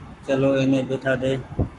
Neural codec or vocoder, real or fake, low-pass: codec, 44.1 kHz, 7.8 kbps, Pupu-Codec; fake; 10.8 kHz